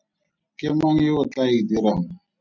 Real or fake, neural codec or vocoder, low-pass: real; none; 7.2 kHz